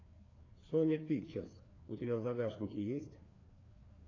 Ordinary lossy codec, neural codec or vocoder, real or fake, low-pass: AAC, 32 kbps; codec, 16 kHz, 2 kbps, FreqCodec, larger model; fake; 7.2 kHz